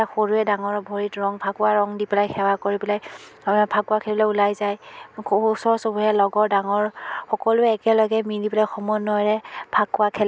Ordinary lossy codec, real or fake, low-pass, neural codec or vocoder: none; real; none; none